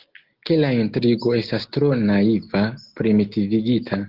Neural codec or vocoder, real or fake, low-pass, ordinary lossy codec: none; real; 5.4 kHz; Opus, 16 kbps